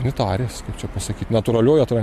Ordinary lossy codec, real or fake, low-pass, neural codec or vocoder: MP3, 64 kbps; real; 14.4 kHz; none